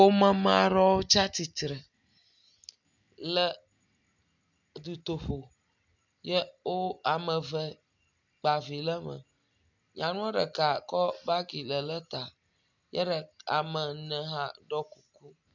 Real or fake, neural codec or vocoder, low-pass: real; none; 7.2 kHz